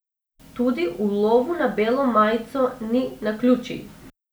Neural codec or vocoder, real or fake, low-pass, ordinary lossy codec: none; real; none; none